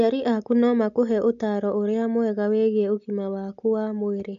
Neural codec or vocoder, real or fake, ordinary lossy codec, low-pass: none; real; AAC, 64 kbps; 7.2 kHz